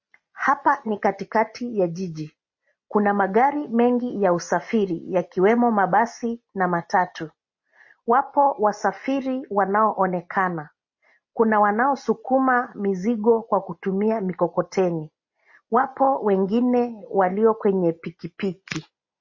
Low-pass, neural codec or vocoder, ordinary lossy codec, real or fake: 7.2 kHz; none; MP3, 32 kbps; real